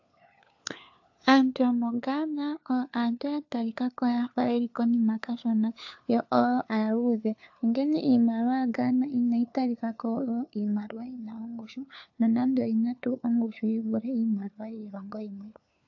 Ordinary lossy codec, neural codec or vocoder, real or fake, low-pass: AAC, 48 kbps; codec, 16 kHz, 4 kbps, FunCodec, trained on LibriTTS, 50 frames a second; fake; 7.2 kHz